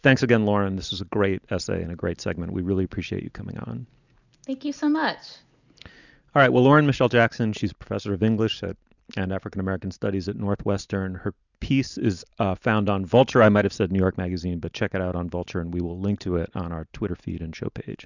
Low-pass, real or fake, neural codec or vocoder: 7.2 kHz; real; none